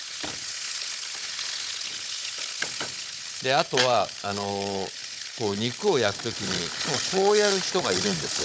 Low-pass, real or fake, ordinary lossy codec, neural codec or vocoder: none; fake; none; codec, 16 kHz, 16 kbps, FunCodec, trained on Chinese and English, 50 frames a second